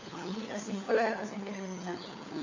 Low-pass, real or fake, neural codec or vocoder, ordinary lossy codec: 7.2 kHz; fake; codec, 16 kHz, 4 kbps, FunCodec, trained on LibriTTS, 50 frames a second; none